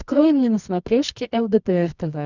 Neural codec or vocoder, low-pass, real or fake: codec, 24 kHz, 0.9 kbps, WavTokenizer, medium music audio release; 7.2 kHz; fake